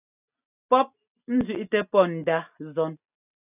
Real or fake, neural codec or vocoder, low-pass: real; none; 3.6 kHz